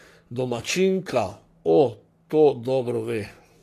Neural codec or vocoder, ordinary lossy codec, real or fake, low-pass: codec, 44.1 kHz, 3.4 kbps, Pupu-Codec; AAC, 64 kbps; fake; 14.4 kHz